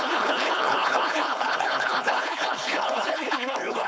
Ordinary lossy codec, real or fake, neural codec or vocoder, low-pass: none; fake; codec, 16 kHz, 4.8 kbps, FACodec; none